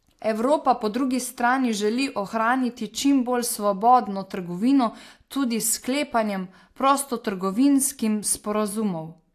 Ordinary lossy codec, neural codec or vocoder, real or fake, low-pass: AAC, 64 kbps; none; real; 14.4 kHz